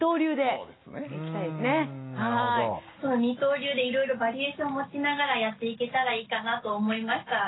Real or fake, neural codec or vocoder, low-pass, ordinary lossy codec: real; none; 7.2 kHz; AAC, 16 kbps